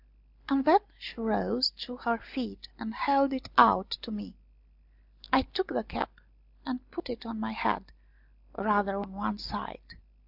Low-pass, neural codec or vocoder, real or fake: 5.4 kHz; none; real